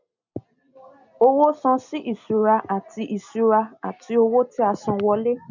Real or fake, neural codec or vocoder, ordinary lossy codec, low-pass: real; none; none; 7.2 kHz